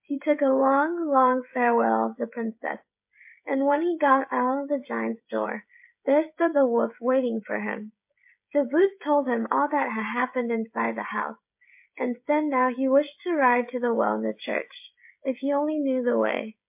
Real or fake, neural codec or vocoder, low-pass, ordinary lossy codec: fake; codec, 16 kHz, 16 kbps, FreqCodec, smaller model; 3.6 kHz; MP3, 32 kbps